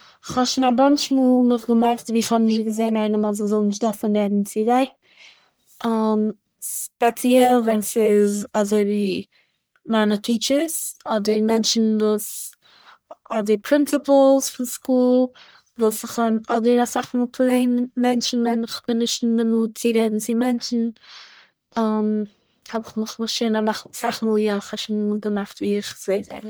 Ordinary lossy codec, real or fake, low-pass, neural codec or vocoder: none; fake; none; codec, 44.1 kHz, 1.7 kbps, Pupu-Codec